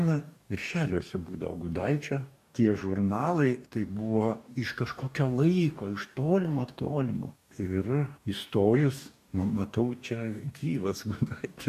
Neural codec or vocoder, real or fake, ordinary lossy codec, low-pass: codec, 44.1 kHz, 2.6 kbps, DAC; fake; AAC, 96 kbps; 14.4 kHz